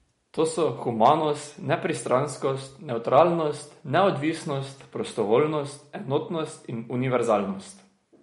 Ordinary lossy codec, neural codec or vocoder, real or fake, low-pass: MP3, 48 kbps; none; real; 19.8 kHz